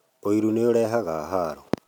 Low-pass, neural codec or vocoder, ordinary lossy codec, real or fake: 19.8 kHz; none; none; real